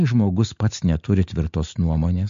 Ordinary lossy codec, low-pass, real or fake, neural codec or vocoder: MP3, 48 kbps; 7.2 kHz; real; none